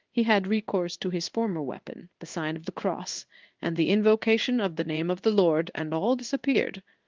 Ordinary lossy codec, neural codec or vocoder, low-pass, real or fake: Opus, 32 kbps; codec, 16 kHz in and 24 kHz out, 1 kbps, XY-Tokenizer; 7.2 kHz; fake